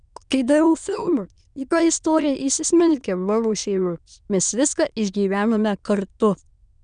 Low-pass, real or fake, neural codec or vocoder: 9.9 kHz; fake; autoencoder, 22.05 kHz, a latent of 192 numbers a frame, VITS, trained on many speakers